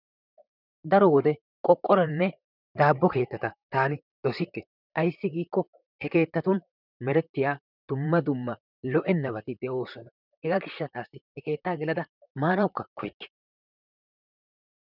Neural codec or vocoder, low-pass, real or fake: vocoder, 44.1 kHz, 128 mel bands, Pupu-Vocoder; 5.4 kHz; fake